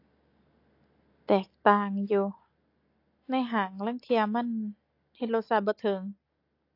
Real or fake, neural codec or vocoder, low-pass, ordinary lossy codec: real; none; 5.4 kHz; AAC, 32 kbps